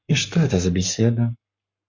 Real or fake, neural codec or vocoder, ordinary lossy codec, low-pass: fake; codec, 44.1 kHz, 7.8 kbps, Pupu-Codec; MP3, 48 kbps; 7.2 kHz